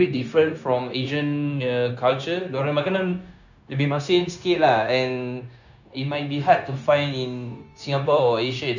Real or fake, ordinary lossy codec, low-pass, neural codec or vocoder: fake; none; 7.2 kHz; codec, 16 kHz, 0.9 kbps, LongCat-Audio-Codec